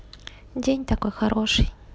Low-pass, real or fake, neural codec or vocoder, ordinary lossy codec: none; real; none; none